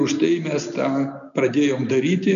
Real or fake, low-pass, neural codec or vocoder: real; 7.2 kHz; none